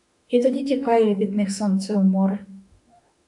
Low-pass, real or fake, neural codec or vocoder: 10.8 kHz; fake; autoencoder, 48 kHz, 32 numbers a frame, DAC-VAE, trained on Japanese speech